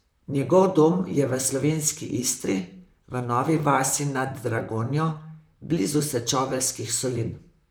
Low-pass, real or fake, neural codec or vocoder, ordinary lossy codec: none; fake; vocoder, 44.1 kHz, 128 mel bands, Pupu-Vocoder; none